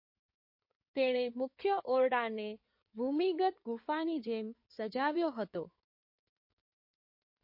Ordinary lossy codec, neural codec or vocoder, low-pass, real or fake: MP3, 32 kbps; codec, 16 kHz in and 24 kHz out, 2.2 kbps, FireRedTTS-2 codec; 5.4 kHz; fake